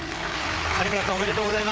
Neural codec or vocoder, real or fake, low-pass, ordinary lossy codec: codec, 16 kHz, 4 kbps, FreqCodec, larger model; fake; none; none